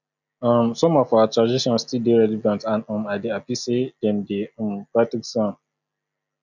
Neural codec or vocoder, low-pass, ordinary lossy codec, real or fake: none; 7.2 kHz; none; real